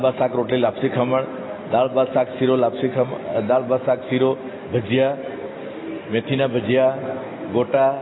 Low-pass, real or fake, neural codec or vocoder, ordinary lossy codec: 7.2 kHz; real; none; AAC, 16 kbps